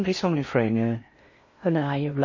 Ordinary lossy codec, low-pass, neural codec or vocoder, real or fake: MP3, 32 kbps; 7.2 kHz; codec, 16 kHz in and 24 kHz out, 0.6 kbps, FocalCodec, streaming, 4096 codes; fake